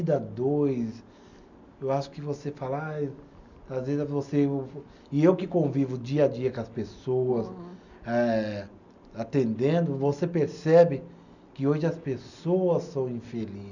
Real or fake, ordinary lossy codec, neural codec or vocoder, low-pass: real; none; none; 7.2 kHz